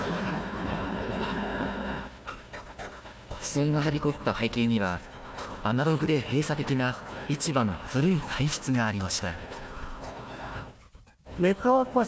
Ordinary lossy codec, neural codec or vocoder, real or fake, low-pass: none; codec, 16 kHz, 1 kbps, FunCodec, trained on Chinese and English, 50 frames a second; fake; none